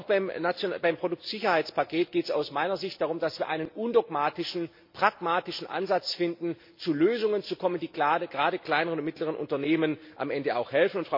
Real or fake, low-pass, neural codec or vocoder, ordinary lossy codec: real; 5.4 kHz; none; MP3, 32 kbps